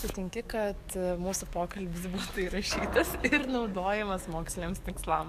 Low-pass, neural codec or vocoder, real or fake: 14.4 kHz; codec, 44.1 kHz, 7.8 kbps, DAC; fake